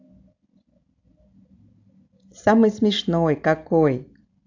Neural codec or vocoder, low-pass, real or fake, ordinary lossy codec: none; 7.2 kHz; real; AAC, 48 kbps